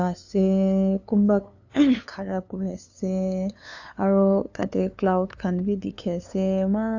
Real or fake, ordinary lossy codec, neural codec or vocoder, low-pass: fake; AAC, 48 kbps; codec, 16 kHz, 2 kbps, FunCodec, trained on LibriTTS, 25 frames a second; 7.2 kHz